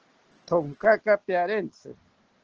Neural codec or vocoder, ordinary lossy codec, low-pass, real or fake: none; Opus, 16 kbps; 7.2 kHz; real